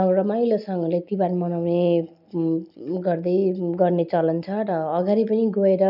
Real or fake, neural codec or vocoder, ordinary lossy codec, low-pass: real; none; none; 5.4 kHz